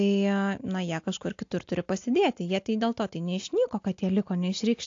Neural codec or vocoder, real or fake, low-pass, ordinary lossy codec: none; real; 7.2 kHz; AAC, 48 kbps